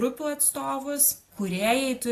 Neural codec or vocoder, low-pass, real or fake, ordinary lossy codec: none; 14.4 kHz; real; AAC, 48 kbps